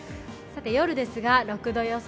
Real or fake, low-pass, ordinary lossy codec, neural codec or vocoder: real; none; none; none